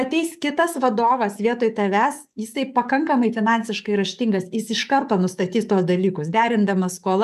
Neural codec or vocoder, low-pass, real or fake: codec, 44.1 kHz, 7.8 kbps, DAC; 14.4 kHz; fake